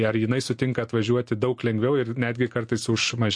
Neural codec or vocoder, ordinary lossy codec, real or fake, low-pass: none; MP3, 48 kbps; real; 9.9 kHz